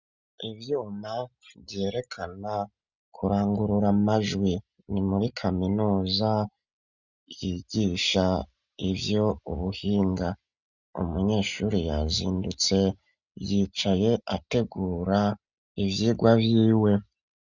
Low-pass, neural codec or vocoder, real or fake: 7.2 kHz; none; real